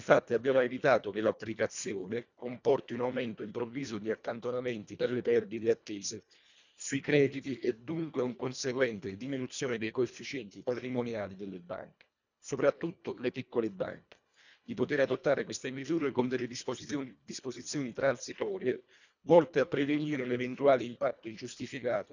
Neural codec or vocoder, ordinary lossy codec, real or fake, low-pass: codec, 24 kHz, 1.5 kbps, HILCodec; none; fake; 7.2 kHz